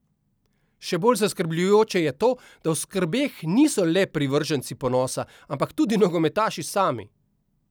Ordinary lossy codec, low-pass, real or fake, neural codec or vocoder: none; none; real; none